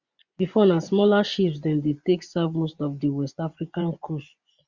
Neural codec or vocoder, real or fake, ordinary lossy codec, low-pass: vocoder, 24 kHz, 100 mel bands, Vocos; fake; Opus, 64 kbps; 7.2 kHz